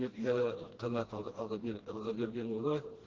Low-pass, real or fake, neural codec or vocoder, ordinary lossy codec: 7.2 kHz; fake; codec, 16 kHz, 1 kbps, FreqCodec, smaller model; Opus, 16 kbps